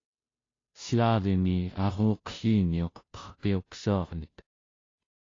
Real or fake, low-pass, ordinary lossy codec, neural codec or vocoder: fake; 7.2 kHz; AAC, 32 kbps; codec, 16 kHz, 0.5 kbps, FunCodec, trained on Chinese and English, 25 frames a second